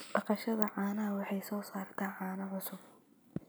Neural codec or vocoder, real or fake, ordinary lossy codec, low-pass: none; real; none; none